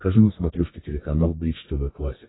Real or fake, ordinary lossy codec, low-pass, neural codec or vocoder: fake; AAC, 16 kbps; 7.2 kHz; codec, 32 kHz, 1.9 kbps, SNAC